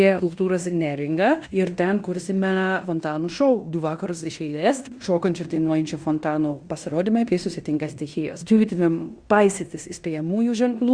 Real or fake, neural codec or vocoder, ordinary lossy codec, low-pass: fake; codec, 16 kHz in and 24 kHz out, 0.9 kbps, LongCat-Audio-Codec, fine tuned four codebook decoder; Opus, 64 kbps; 9.9 kHz